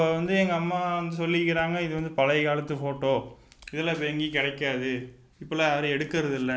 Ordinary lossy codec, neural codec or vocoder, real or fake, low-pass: none; none; real; none